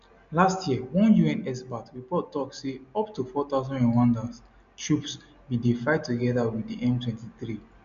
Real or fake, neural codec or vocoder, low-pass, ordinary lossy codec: real; none; 7.2 kHz; none